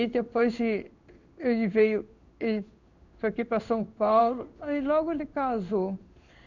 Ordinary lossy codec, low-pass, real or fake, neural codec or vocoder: none; 7.2 kHz; fake; codec, 16 kHz in and 24 kHz out, 1 kbps, XY-Tokenizer